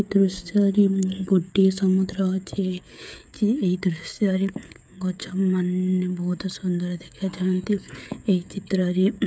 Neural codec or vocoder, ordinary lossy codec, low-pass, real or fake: codec, 16 kHz, 16 kbps, FreqCodec, smaller model; none; none; fake